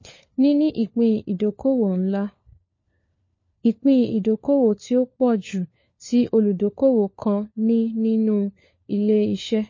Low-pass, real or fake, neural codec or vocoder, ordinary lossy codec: 7.2 kHz; fake; codec, 16 kHz, 4 kbps, FunCodec, trained on LibriTTS, 50 frames a second; MP3, 32 kbps